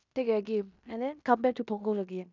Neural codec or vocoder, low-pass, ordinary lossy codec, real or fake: codec, 16 kHz in and 24 kHz out, 0.9 kbps, LongCat-Audio-Codec, fine tuned four codebook decoder; 7.2 kHz; none; fake